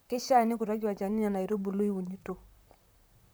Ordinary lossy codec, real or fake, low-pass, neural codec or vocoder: none; fake; none; vocoder, 44.1 kHz, 128 mel bands, Pupu-Vocoder